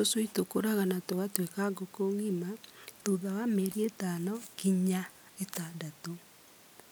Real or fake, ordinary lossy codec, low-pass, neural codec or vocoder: real; none; none; none